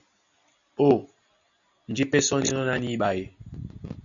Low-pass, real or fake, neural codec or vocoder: 7.2 kHz; real; none